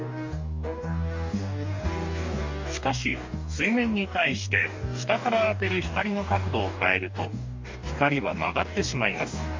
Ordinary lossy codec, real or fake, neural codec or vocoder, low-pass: MP3, 48 kbps; fake; codec, 44.1 kHz, 2.6 kbps, DAC; 7.2 kHz